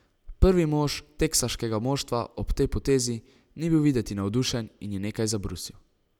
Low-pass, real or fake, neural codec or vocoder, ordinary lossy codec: 19.8 kHz; real; none; none